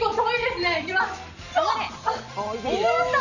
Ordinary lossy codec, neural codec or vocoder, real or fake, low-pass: none; none; real; 7.2 kHz